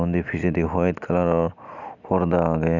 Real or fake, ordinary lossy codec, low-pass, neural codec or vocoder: real; none; 7.2 kHz; none